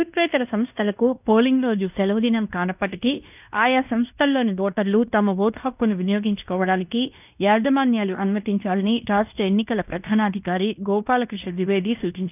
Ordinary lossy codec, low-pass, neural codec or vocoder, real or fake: none; 3.6 kHz; codec, 16 kHz in and 24 kHz out, 0.9 kbps, LongCat-Audio-Codec, fine tuned four codebook decoder; fake